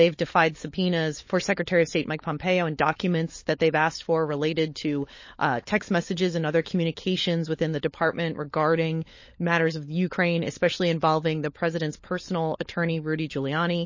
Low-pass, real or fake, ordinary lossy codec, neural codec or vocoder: 7.2 kHz; fake; MP3, 32 kbps; codec, 16 kHz, 16 kbps, FunCodec, trained on LibriTTS, 50 frames a second